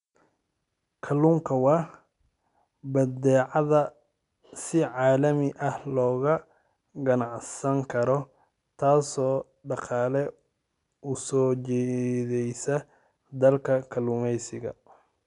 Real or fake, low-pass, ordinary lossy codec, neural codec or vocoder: real; 10.8 kHz; none; none